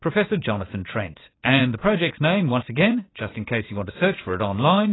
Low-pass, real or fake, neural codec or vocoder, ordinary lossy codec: 7.2 kHz; fake; vocoder, 44.1 kHz, 80 mel bands, Vocos; AAC, 16 kbps